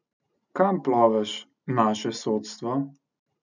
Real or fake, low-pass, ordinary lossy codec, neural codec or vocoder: real; 7.2 kHz; none; none